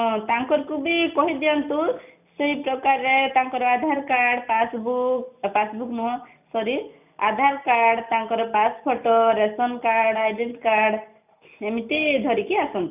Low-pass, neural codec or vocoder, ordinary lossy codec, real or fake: 3.6 kHz; none; none; real